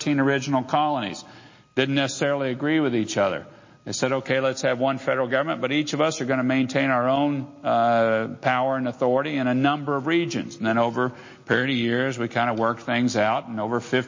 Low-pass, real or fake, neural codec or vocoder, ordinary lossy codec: 7.2 kHz; real; none; MP3, 32 kbps